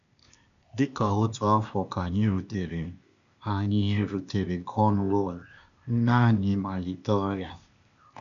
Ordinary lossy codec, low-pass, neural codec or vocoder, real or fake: none; 7.2 kHz; codec, 16 kHz, 0.8 kbps, ZipCodec; fake